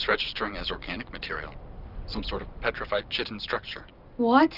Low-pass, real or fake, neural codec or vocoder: 5.4 kHz; real; none